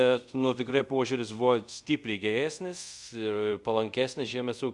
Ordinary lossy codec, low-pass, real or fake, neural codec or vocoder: Opus, 64 kbps; 10.8 kHz; fake; codec, 24 kHz, 0.5 kbps, DualCodec